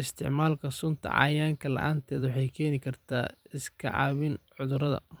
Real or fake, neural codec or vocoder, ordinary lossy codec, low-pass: fake; vocoder, 44.1 kHz, 128 mel bands every 512 samples, BigVGAN v2; none; none